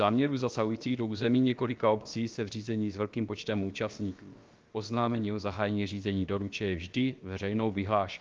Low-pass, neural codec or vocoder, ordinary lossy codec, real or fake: 7.2 kHz; codec, 16 kHz, about 1 kbps, DyCAST, with the encoder's durations; Opus, 32 kbps; fake